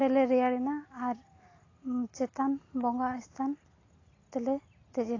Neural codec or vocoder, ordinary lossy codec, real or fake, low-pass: none; AAC, 32 kbps; real; 7.2 kHz